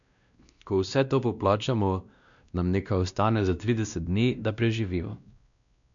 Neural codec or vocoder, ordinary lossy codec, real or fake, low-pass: codec, 16 kHz, 1 kbps, X-Codec, WavLM features, trained on Multilingual LibriSpeech; none; fake; 7.2 kHz